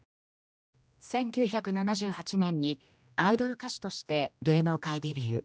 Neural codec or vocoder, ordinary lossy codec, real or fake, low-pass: codec, 16 kHz, 1 kbps, X-Codec, HuBERT features, trained on general audio; none; fake; none